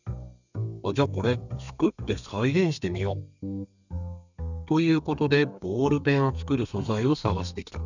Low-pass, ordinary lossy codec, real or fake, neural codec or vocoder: 7.2 kHz; none; fake; codec, 32 kHz, 1.9 kbps, SNAC